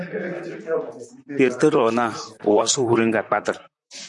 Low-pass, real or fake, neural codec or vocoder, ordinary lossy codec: 10.8 kHz; fake; vocoder, 44.1 kHz, 128 mel bands, Pupu-Vocoder; MP3, 96 kbps